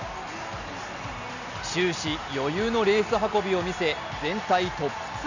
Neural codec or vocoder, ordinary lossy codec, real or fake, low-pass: none; none; real; 7.2 kHz